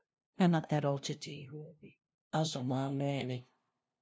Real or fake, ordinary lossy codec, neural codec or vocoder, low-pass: fake; none; codec, 16 kHz, 0.5 kbps, FunCodec, trained on LibriTTS, 25 frames a second; none